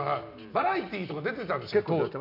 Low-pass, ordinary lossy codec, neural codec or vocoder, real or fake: 5.4 kHz; none; none; real